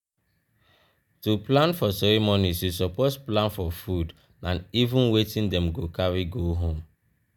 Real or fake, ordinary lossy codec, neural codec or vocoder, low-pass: real; none; none; none